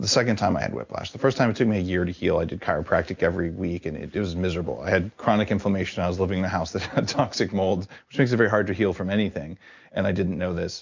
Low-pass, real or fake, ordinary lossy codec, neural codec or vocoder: 7.2 kHz; real; MP3, 64 kbps; none